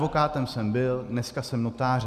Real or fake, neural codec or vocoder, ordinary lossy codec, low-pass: real; none; Opus, 64 kbps; 14.4 kHz